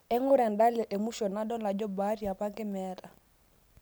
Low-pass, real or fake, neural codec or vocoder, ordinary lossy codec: none; real; none; none